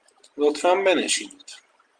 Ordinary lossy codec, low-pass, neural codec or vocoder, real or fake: Opus, 32 kbps; 9.9 kHz; none; real